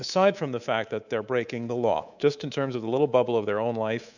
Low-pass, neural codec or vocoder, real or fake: 7.2 kHz; codec, 24 kHz, 3.1 kbps, DualCodec; fake